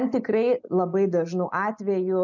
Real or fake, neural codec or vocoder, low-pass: real; none; 7.2 kHz